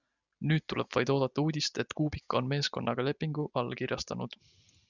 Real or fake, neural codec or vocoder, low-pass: real; none; 7.2 kHz